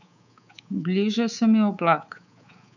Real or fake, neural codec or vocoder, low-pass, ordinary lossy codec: fake; codec, 24 kHz, 3.1 kbps, DualCodec; 7.2 kHz; none